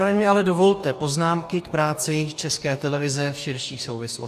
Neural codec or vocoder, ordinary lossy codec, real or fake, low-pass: codec, 44.1 kHz, 2.6 kbps, DAC; AAC, 96 kbps; fake; 14.4 kHz